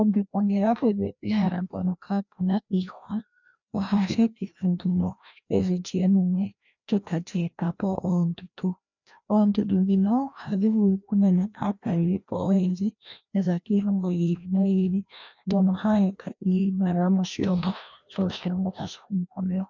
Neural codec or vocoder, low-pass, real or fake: codec, 16 kHz, 1 kbps, FreqCodec, larger model; 7.2 kHz; fake